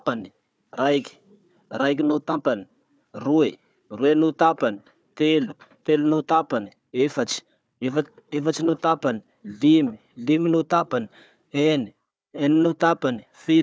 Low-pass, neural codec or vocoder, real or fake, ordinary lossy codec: none; codec, 16 kHz, 4 kbps, FunCodec, trained on Chinese and English, 50 frames a second; fake; none